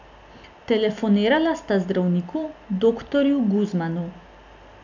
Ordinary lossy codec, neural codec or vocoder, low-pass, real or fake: none; none; 7.2 kHz; real